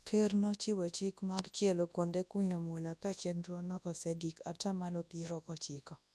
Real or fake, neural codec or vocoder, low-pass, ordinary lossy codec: fake; codec, 24 kHz, 0.9 kbps, WavTokenizer, large speech release; none; none